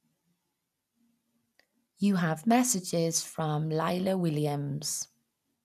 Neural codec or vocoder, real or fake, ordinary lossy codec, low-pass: none; real; none; 14.4 kHz